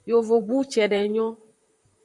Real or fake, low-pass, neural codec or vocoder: fake; 10.8 kHz; vocoder, 44.1 kHz, 128 mel bands, Pupu-Vocoder